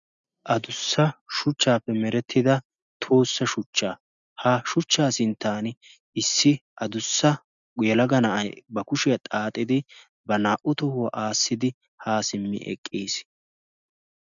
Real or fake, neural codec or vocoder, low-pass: real; none; 7.2 kHz